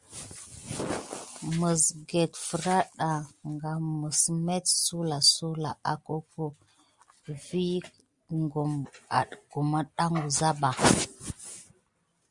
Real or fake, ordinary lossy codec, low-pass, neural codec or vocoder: real; Opus, 64 kbps; 10.8 kHz; none